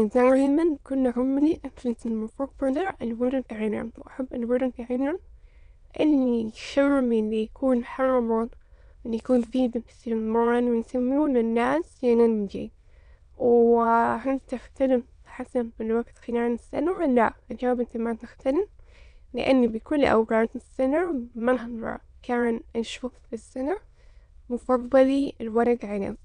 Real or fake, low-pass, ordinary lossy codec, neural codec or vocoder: fake; 9.9 kHz; none; autoencoder, 22.05 kHz, a latent of 192 numbers a frame, VITS, trained on many speakers